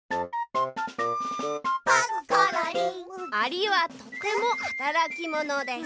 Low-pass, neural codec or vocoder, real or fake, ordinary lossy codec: none; none; real; none